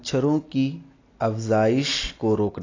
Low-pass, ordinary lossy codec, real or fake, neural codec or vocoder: 7.2 kHz; AAC, 32 kbps; real; none